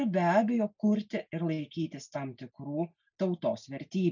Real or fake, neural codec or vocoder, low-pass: real; none; 7.2 kHz